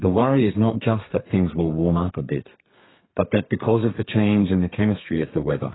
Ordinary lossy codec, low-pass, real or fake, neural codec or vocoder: AAC, 16 kbps; 7.2 kHz; fake; codec, 32 kHz, 1.9 kbps, SNAC